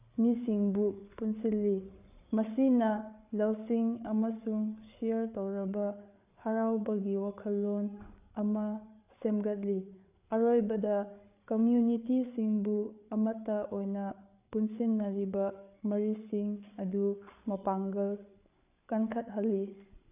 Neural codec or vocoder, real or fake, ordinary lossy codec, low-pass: codec, 16 kHz, 8 kbps, FreqCodec, larger model; fake; AAC, 32 kbps; 3.6 kHz